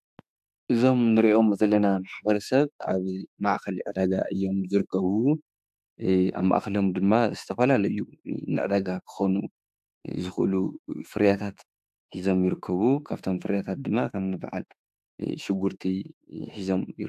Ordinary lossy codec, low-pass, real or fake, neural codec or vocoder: AAC, 96 kbps; 14.4 kHz; fake; autoencoder, 48 kHz, 32 numbers a frame, DAC-VAE, trained on Japanese speech